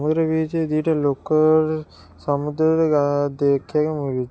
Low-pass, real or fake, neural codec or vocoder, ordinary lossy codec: none; real; none; none